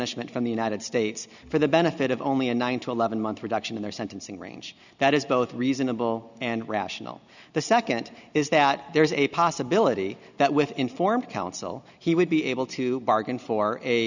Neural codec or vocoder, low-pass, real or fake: none; 7.2 kHz; real